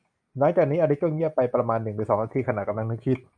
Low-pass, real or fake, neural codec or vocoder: 9.9 kHz; real; none